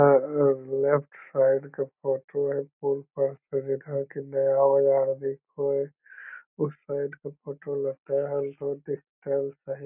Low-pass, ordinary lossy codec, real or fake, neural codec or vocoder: 3.6 kHz; none; real; none